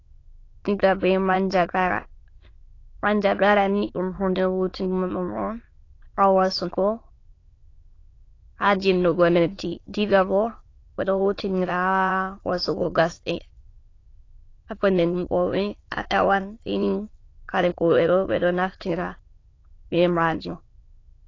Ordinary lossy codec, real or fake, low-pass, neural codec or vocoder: AAC, 32 kbps; fake; 7.2 kHz; autoencoder, 22.05 kHz, a latent of 192 numbers a frame, VITS, trained on many speakers